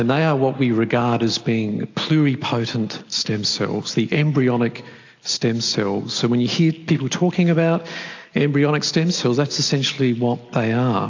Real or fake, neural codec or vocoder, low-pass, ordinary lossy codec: real; none; 7.2 kHz; AAC, 48 kbps